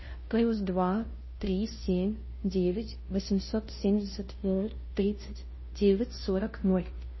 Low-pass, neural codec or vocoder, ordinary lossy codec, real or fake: 7.2 kHz; codec, 16 kHz, 0.5 kbps, FunCodec, trained on Chinese and English, 25 frames a second; MP3, 24 kbps; fake